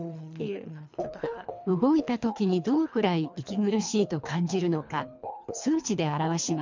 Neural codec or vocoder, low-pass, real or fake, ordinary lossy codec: codec, 24 kHz, 3 kbps, HILCodec; 7.2 kHz; fake; MP3, 64 kbps